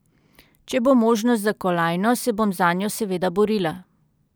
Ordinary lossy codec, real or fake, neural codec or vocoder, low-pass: none; real; none; none